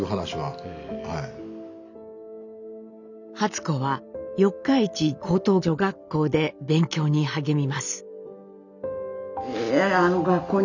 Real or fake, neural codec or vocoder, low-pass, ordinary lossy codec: real; none; 7.2 kHz; none